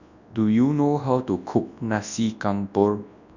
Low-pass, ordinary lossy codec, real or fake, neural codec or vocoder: 7.2 kHz; none; fake; codec, 24 kHz, 0.9 kbps, WavTokenizer, large speech release